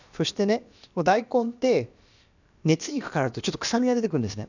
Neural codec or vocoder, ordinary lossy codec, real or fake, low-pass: codec, 16 kHz, about 1 kbps, DyCAST, with the encoder's durations; none; fake; 7.2 kHz